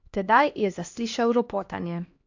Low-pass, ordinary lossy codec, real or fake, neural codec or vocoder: 7.2 kHz; AAC, 48 kbps; fake; codec, 16 kHz, 1 kbps, X-Codec, HuBERT features, trained on LibriSpeech